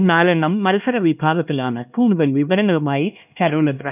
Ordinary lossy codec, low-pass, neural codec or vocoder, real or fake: none; 3.6 kHz; codec, 16 kHz, 1 kbps, X-Codec, HuBERT features, trained on LibriSpeech; fake